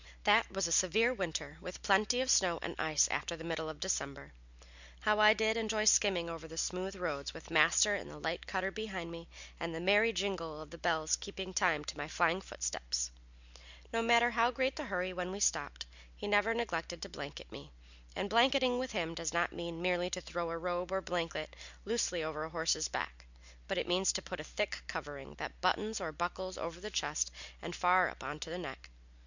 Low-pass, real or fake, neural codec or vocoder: 7.2 kHz; real; none